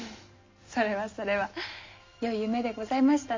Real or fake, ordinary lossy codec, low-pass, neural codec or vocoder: real; MP3, 64 kbps; 7.2 kHz; none